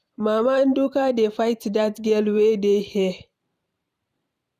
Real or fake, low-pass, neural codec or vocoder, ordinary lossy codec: fake; 14.4 kHz; vocoder, 44.1 kHz, 128 mel bands every 512 samples, BigVGAN v2; none